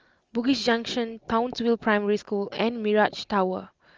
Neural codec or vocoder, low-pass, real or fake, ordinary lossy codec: none; 7.2 kHz; real; Opus, 24 kbps